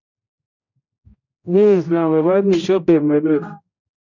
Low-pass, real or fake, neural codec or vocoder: 7.2 kHz; fake; codec, 16 kHz, 0.5 kbps, X-Codec, HuBERT features, trained on general audio